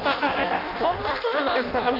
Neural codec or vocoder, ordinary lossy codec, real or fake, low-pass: codec, 16 kHz in and 24 kHz out, 0.6 kbps, FireRedTTS-2 codec; none; fake; 5.4 kHz